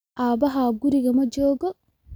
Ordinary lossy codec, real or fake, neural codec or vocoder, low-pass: none; real; none; none